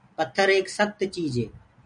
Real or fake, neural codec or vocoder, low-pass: real; none; 9.9 kHz